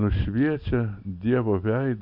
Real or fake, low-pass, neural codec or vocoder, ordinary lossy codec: real; 5.4 kHz; none; MP3, 48 kbps